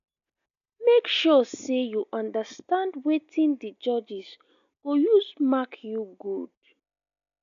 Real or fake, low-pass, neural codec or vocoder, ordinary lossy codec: real; 7.2 kHz; none; none